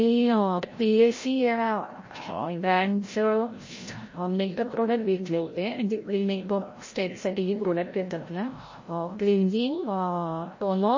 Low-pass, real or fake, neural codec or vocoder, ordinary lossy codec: 7.2 kHz; fake; codec, 16 kHz, 0.5 kbps, FreqCodec, larger model; MP3, 32 kbps